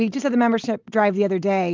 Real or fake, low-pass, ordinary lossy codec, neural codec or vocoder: real; 7.2 kHz; Opus, 32 kbps; none